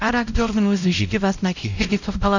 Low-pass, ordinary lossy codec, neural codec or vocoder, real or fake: 7.2 kHz; none; codec, 16 kHz, 0.5 kbps, X-Codec, WavLM features, trained on Multilingual LibriSpeech; fake